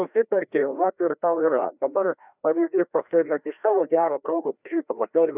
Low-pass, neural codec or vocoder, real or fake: 3.6 kHz; codec, 16 kHz, 1 kbps, FreqCodec, larger model; fake